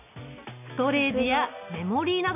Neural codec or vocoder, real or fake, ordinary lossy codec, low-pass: none; real; none; 3.6 kHz